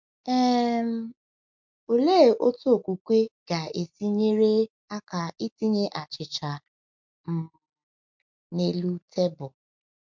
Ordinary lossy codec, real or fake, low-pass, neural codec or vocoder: MP3, 64 kbps; real; 7.2 kHz; none